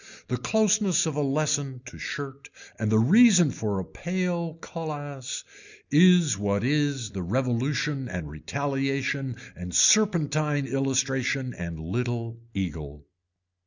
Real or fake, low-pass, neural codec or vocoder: real; 7.2 kHz; none